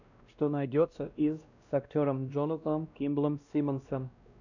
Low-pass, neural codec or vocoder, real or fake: 7.2 kHz; codec, 16 kHz, 1 kbps, X-Codec, WavLM features, trained on Multilingual LibriSpeech; fake